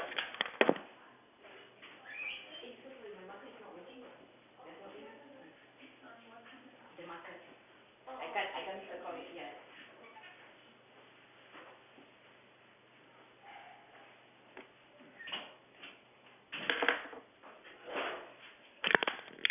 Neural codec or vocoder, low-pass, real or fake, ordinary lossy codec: none; 3.6 kHz; real; none